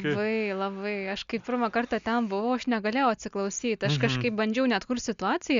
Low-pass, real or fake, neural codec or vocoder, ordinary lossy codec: 7.2 kHz; real; none; Opus, 64 kbps